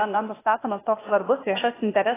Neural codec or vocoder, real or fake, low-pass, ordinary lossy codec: codec, 16 kHz, 0.8 kbps, ZipCodec; fake; 3.6 kHz; AAC, 16 kbps